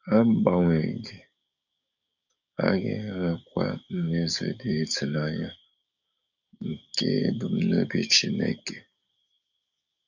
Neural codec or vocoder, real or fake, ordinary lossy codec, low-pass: codec, 44.1 kHz, 7.8 kbps, DAC; fake; none; 7.2 kHz